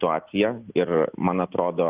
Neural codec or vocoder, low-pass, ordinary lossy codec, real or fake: none; 3.6 kHz; Opus, 24 kbps; real